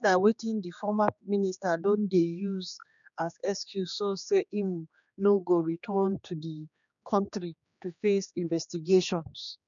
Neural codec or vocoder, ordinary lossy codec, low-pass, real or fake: codec, 16 kHz, 2 kbps, X-Codec, HuBERT features, trained on general audio; MP3, 96 kbps; 7.2 kHz; fake